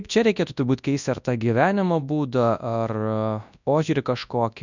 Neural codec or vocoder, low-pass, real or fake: codec, 24 kHz, 0.9 kbps, WavTokenizer, large speech release; 7.2 kHz; fake